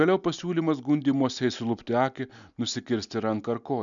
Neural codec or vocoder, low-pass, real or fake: none; 7.2 kHz; real